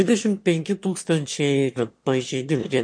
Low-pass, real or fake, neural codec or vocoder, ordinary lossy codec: 9.9 kHz; fake; autoencoder, 22.05 kHz, a latent of 192 numbers a frame, VITS, trained on one speaker; MP3, 48 kbps